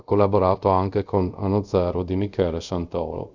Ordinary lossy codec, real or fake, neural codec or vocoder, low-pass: Opus, 64 kbps; fake; codec, 24 kHz, 0.5 kbps, DualCodec; 7.2 kHz